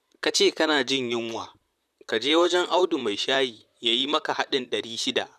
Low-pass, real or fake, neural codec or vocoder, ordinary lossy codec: 14.4 kHz; fake; vocoder, 44.1 kHz, 128 mel bands, Pupu-Vocoder; none